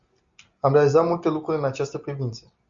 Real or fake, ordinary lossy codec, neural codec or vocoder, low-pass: real; Opus, 64 kbps; none; 7.2 kHz